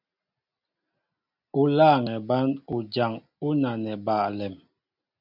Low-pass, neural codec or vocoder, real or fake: 5.4 kHz; none; real